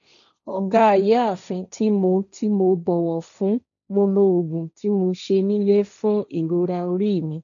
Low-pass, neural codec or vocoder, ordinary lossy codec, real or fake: 7.2 kHz; codec, 16 kHz, 1.1 kbps, Voila-Tokenizer; none; fake